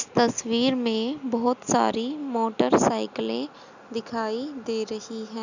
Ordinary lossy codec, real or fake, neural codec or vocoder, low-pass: none; real; none; 7.2 kHz